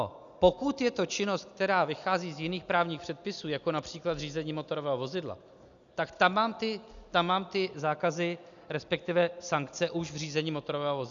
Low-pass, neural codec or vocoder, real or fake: 7.2 kHz; none; real